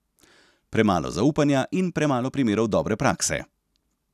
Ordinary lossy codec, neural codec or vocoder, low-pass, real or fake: none; none; 14.4 kHz; real